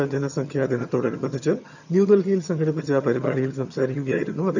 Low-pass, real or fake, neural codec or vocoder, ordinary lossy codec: 7.2 kHz; fake; vocoder, 22.05 kHz, 80 mel bands, HiFi-GAN; none